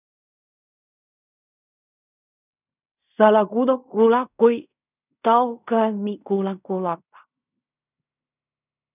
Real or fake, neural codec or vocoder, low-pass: fake; codec, 16 kHz in and 24 kHz out, 0.4 kbps, LongCat-Audio-Codec, fine tuned four codebook decoder; 3.6 kHz